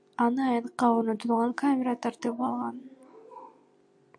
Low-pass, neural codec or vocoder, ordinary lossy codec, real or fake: 9.9 kHz; none; AAC, 64 kbps; real